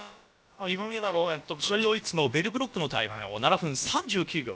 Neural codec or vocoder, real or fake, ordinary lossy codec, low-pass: codec, 16 kHz, about 1 kbps, DyCAST, with the encoder's durations; fake; none; none